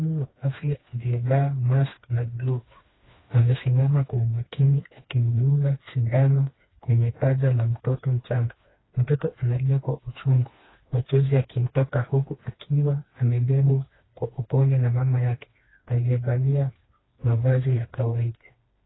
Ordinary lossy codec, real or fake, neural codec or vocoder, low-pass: AAC, 16 kbps; fake; codec, 16 kHz, 2 kbps, FreqCodec, smaller model; 7.2 kHz